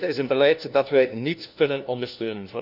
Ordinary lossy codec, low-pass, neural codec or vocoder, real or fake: none; 5.4 kHz; codec, 16 kHz, 1 kbps, FunCodec, trained on LibriTTS, 50 frames a second; fake